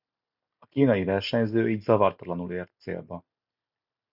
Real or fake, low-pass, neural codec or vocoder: real; 5.4 kHz; none